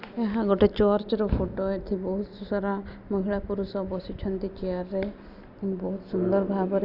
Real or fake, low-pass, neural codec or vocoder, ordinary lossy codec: real; 5.4 kHz; none; none